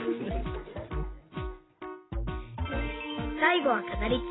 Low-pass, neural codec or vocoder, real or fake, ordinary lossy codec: 7.2 kHz; none; real; AAC, 16 kbps